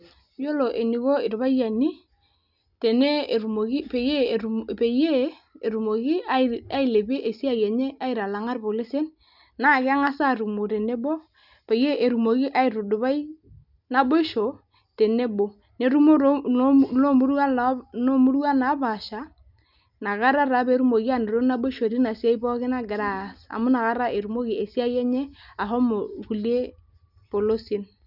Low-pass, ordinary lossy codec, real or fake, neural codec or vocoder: 5.4 kHz; none; real; none